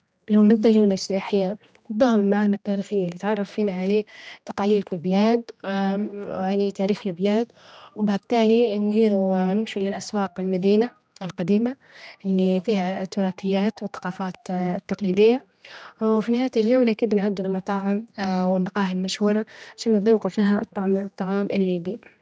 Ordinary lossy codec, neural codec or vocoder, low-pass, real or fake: none; codec, 16 kHz, 1 kbps, X-Codec, HuBERT features, trained on general audio; none; fake